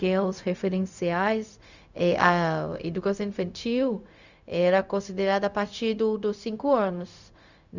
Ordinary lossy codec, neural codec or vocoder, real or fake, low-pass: none; codec, 16 kHz, 0.4 kbps, LongCat-Audio-Codec; fake; 7.2 kHz